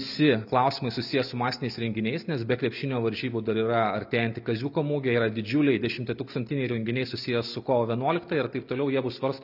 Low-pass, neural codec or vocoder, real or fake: 5.4 kHz; none; real